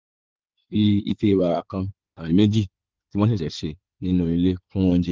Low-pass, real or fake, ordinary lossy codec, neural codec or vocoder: 7.2 kHz; fake; Opus, 32 kbps; codec, 16 kHz in and 24 kHz out, 2.2 kbps, FireRedTTS-2 codec